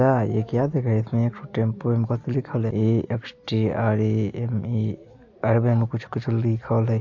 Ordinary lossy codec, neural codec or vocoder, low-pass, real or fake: AAC, 48 kbps; none; 7.2 kHz; real